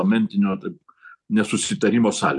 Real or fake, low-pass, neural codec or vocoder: real; 10.8 kHz; none